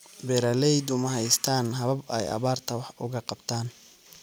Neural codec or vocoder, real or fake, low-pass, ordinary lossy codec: none; real; none; none